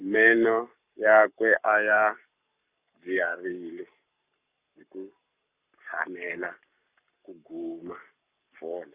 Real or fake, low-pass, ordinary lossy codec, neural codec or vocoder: fake; 3.6 kHz; Opus, 64 kbps; codec, 44.1 kHz, 7.8 kbps, Pupu-Codec